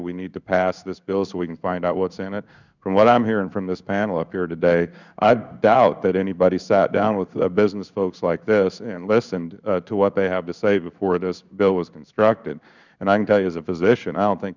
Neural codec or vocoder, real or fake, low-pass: codec, 16 kHz in and 24 kHz out, 1 kbps, XY-Tokenizer; fake; 7.2 kHz